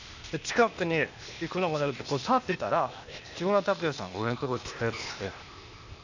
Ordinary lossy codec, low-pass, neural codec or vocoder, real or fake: none; 7.2 kHz; codec, 16 kHz, 0.8 kbps, ZipCodec; fake